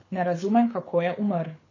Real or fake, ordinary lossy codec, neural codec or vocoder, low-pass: fake; MP3, 32 kbps; codec, 24 kHz, 6 kbps, HILCodec; 7.2 kHz